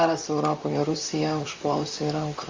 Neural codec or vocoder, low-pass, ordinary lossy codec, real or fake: vocoder, 44.1 kHz, 128 mel bands, Pupu-Vocoder; 7.2 kHz; Opus, 32 kbps; fake